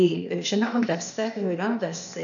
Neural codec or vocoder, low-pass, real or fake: codec, 16 kHz, 0.8 kbps, ZipCodec; 7.2 kHz; fake